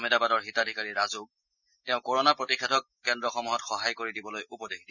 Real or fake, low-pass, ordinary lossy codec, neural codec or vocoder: real; 7.2 kHz; none; none